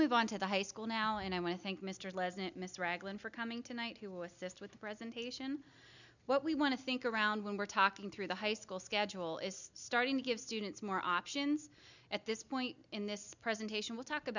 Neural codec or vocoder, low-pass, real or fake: none; 7.2 kHz; real